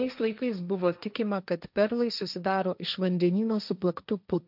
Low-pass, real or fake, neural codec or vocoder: 5.4 kHz; fake; codec, 16 kHz, 1.1 kbps, Voila-Tokenizer